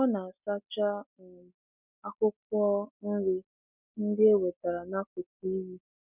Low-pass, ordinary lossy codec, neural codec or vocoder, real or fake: 3.6 kHz; none; none; real